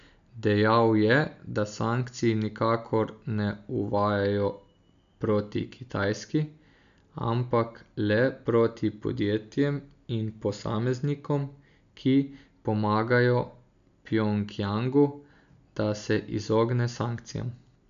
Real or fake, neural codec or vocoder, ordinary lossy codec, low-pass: real; none; MP3, 96 kbps; 7.2 kHz